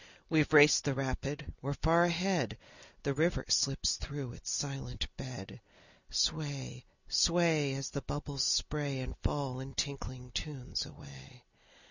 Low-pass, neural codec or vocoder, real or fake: 7.2 kHz; none; real